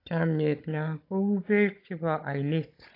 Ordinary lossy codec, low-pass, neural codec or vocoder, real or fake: AAC, 32 kbps; 5.4 kHz; codec, 16 kHz, 16 kbps, FunCodec, trained on Chinese and English, 50 frames a second; fake